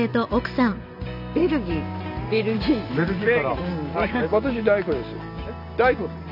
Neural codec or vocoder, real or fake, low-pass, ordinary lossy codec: none; real; 5.4 kHz; none